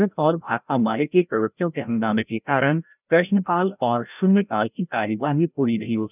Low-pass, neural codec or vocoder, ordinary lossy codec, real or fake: 3.6 kHz; codec, 16 kHz, 0.5 kbps, FreqCodec, larger model; none; fake